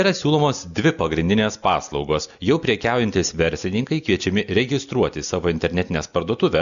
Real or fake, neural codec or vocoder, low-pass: real; none; 7.2 kHz